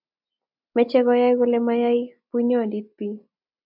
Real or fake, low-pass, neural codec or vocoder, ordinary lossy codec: real; 5.4 kHz; none; MP3, 48 kbps